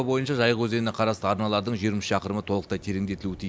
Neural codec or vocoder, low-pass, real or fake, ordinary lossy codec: none; none; real; none